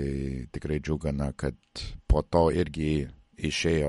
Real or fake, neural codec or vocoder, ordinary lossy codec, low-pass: real; none; MP3, 48 kbps; 19.8 kHz